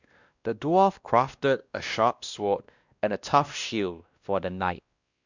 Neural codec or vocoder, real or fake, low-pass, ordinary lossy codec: codec, 16 kHz, 1 kbps, X-Codec, WavLM features, trained on Multilingual LibriSpeech; fake; 7.2 kHz; Opus, 64 kbps